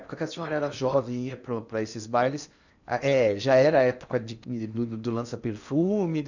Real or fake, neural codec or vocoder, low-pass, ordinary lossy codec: fake; codec, 16 kHz in and 24 kHz out, 0.8 kbps, FocalCodec, streaming, 65536 codes; 7.2 kHz; none